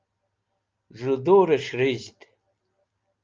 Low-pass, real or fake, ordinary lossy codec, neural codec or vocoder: 7.2 kHz; real; Opus, 24 kbps; none